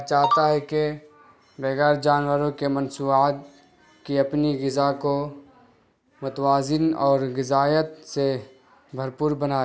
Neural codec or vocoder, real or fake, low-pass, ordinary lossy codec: none; real; none; none